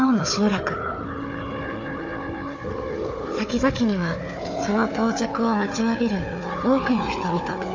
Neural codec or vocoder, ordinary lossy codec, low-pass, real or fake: codec, 16 kHz, 4 kbps, FunCodec, trained on Chinese and English, 50 frames a second; AAC, 48 kbps; 7.2 kHz; fake